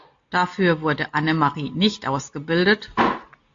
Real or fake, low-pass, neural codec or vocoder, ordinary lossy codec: real; 7.2 kHz; none; AAC, 64 kbps